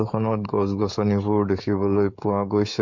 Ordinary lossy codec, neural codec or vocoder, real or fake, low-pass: MP3, 48 kbps; codec, 16 kHz, 16 kbps, FreqCodec, smaller model; fake; 7.2 kHz